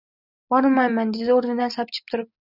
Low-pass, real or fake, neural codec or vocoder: 5.4 kHz; real; none